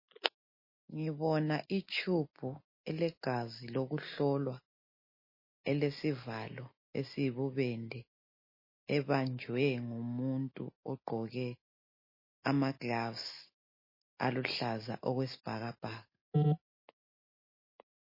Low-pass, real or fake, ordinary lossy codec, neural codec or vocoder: 5.4 kHz; real; MP3, 24 kbps; none